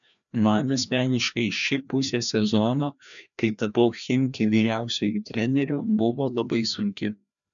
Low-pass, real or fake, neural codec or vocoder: 7.2 kHz; fake; codec, 16 kHz, 1 kbps, FreqCodec, larger model